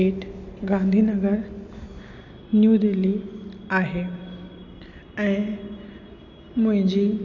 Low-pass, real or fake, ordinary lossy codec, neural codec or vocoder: 7.2 kHz; real; none; none